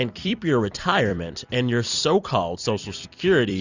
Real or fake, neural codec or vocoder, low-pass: fake; vocoder, 44.1 kHz, 128 mel bands every 256 samples, BigVGAN v2; 7.2 kHz